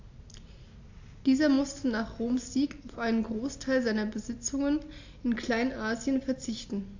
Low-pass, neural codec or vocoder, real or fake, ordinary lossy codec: 7.2 kHz; none; real; AAC, 48 kbps